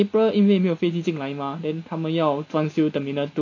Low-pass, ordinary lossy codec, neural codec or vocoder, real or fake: 7.2 kHz; none; none; real